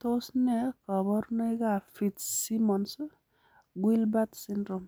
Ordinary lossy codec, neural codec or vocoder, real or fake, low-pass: none; none; real; none